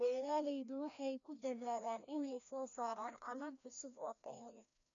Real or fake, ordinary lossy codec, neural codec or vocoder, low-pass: fake; none; codec, 16 kHz, 1 kbps, FreqCodec, larger model; 7.2 kHz